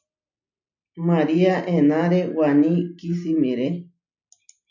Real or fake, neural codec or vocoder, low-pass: real; none; 7.2 kHz